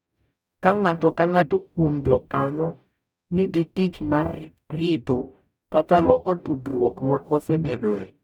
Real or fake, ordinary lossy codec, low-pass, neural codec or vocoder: fake; none; 19.8 kHz; codec, 44.1 kHz, 0.9 kbps, DAC